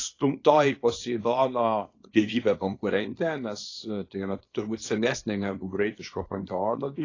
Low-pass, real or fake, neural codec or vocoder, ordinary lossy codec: 7.2 kHz; fake; codec, 24 kHz, 0.9 kbps, WavTokenizer, small release; AAC, 32 kbps